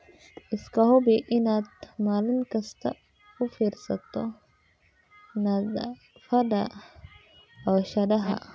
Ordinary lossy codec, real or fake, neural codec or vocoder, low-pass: none; real; none; none